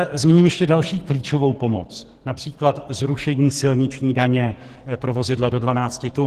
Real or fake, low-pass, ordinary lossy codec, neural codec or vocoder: fake; 14.4 kHz; Opus, 16 kbps; codec, 44.1 kHz, 2.6 kbps, SNAC